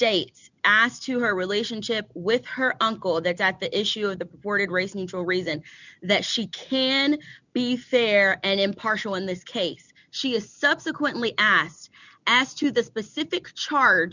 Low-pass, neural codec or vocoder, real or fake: 7.2 kHz; none; real